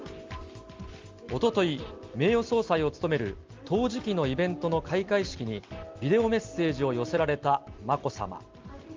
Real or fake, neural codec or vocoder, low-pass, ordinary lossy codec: real; none; 7.2 kHz; Opus, 32 kbps